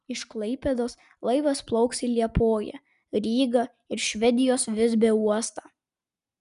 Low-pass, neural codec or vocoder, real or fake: 10.8 kHz; none; real